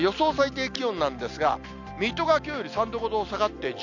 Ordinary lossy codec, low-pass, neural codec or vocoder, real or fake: none; 7.2 kHz; none; real